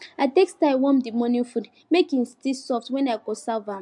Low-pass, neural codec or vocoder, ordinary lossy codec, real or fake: 10.8 kHz; none; MP3, 64 kbps; real